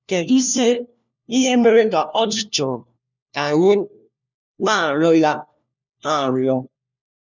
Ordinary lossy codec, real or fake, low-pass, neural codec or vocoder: none; fake; 7.2 kHz; codec, 16 kHz, 1 kbps, FunCodec, trained on LibriTTS, 50 frames a second